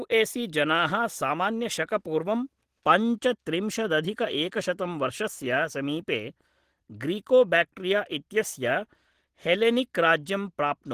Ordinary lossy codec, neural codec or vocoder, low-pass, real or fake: Opus, 16 kbps; none; 14.4 kHz; real